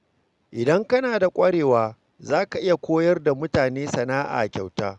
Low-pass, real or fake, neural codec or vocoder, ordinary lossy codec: 10.8 kHz; real; none; Opus, 64 kbps